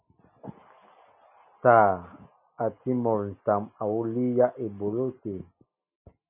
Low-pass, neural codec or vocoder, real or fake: 3.6 kHz; none; real